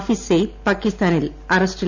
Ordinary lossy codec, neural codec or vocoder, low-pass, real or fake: none; none; 7.2 kHz; real